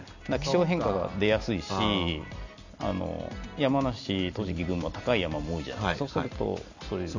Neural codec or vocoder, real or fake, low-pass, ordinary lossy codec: none; real; 7.2 kHz; none